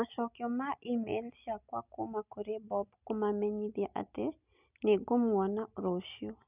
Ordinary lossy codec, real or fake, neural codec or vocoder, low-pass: none; real; none; 3.6 kHz